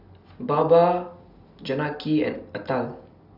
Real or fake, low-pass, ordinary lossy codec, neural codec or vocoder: real; 5.4 kHz; none; none